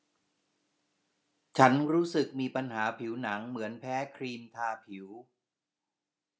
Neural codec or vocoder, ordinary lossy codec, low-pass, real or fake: none; none; none; real